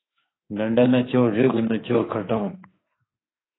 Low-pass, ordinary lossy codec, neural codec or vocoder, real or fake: 7.2 kHz; AAC, 16 kbps; autoencoder, 48 kHz, 32 numbers a frame, DAC-VAE, trained on Japanese speech; fake